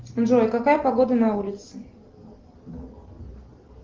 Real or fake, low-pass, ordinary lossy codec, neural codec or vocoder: real; 7.2 kHz; Opus, 16 kbps; none